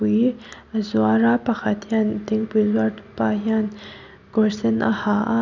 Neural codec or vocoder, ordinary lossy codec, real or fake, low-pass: none; none; real; 7.2 kHz